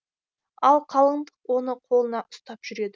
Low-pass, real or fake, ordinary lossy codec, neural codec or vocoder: none; real; none; none